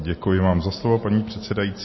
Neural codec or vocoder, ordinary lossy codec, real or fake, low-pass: none; MP3, 24 kbps; real; 7.2 kHz